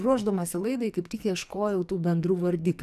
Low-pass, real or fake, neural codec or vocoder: 14.4 kHz; fake; codec, 44.1 kHz, 2.6 kbps, SNAC